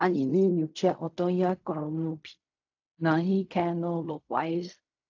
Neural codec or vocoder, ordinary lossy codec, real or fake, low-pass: codec, 16 kHz in and 24 kHz out, 0.4 kbps, LongCat-Audio-Codec, fine tuned four codebook decoder; AAC, 48 kbps; fake; 7.2 kHz